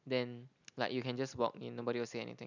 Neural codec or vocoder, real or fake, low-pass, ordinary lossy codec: none; real; 7.2 kHz; none